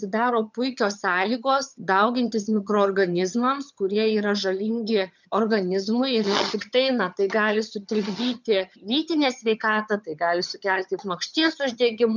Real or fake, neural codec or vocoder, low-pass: fake; vocoder, 22.05 kHz, 80 mel bands, HiFi-GAN; 7.2 kHz